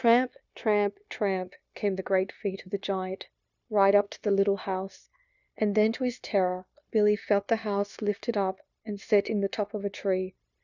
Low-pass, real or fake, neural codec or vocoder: 7.2 kHz; fake; codec, 16 kHz, 0.9 kbps, LongCat-Audio-Codec